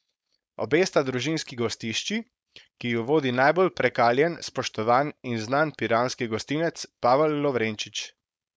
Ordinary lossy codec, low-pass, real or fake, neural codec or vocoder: none; none; fake; codec, 16 kHz, 4.8 kbps, FACodec